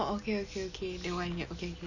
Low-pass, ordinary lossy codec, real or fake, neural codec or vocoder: 7.2 kHz; none; real; none